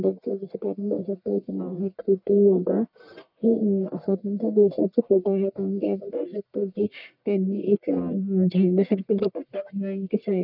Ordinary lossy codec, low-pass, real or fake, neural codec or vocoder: none; 5.4 kHz; fake; codec, 44.1 kHz, 1.7 kbps, Pupu-Codec